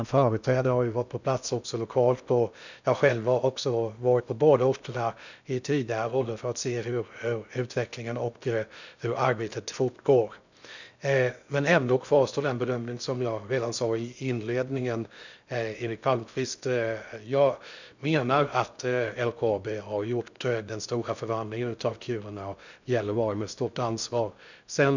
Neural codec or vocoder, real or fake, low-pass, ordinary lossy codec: codec, 16 kHz in and 24 kHz out, 0.6 kbps, FocalCodec, streaming, 2048 codes; fake; 7.2 kHz; none